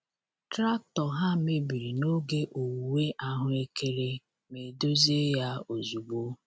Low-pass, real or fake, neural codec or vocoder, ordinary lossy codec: none; real; none; none